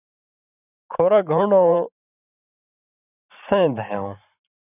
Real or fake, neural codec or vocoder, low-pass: fake; vocoder, 44.1 kHz, 128 mel bands every 512 samples, BigVGAN v2; 3.6 kHz